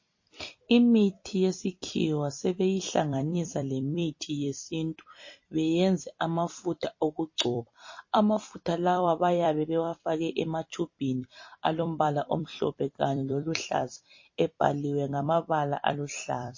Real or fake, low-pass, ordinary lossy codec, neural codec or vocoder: fake; 7.2 kHz; MP3, 32 kbps; vocoder, 44.1 kHz, 128 mel bands every 256 samples, BigVGAN v2